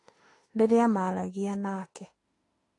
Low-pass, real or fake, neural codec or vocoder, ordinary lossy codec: 10.8 kHz; fake; autoencoder, 48 kHz, 32 numbers a frame, DAC-VAE, trained on Japanese speech; AAC, 32 kbps